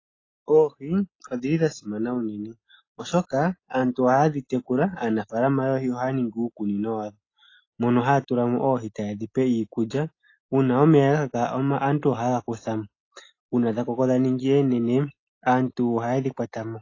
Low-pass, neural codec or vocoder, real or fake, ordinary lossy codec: 7.2 kHz; none; real; AAC, 32 kbps